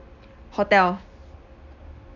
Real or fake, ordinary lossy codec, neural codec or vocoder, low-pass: real; none; none; 7.2 kHz